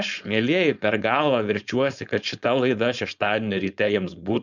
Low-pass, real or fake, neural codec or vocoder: 7.2 kHz; fake; codec, 16 kHz, 4.8 kbps, FACodec